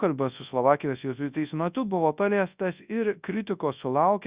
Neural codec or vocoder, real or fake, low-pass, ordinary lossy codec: codec, 24 kHz, 0.9 kbps, WavTokenizer, large speech release; fake; 3.6 kHz; Opus, 64 kbps